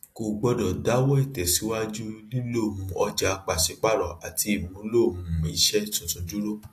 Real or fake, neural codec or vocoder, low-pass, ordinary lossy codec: real; none; 14.4 kHz; AAC, 48 kbps